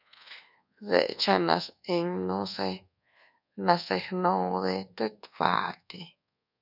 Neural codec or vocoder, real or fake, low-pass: codec, 24 kHz, 1.2 kbps, DualCodec; fake; 5.4 kHz